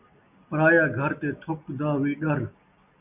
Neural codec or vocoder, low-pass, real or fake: none; 3.6 kHz; real